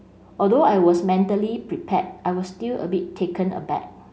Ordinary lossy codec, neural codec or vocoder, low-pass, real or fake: none; none; none; real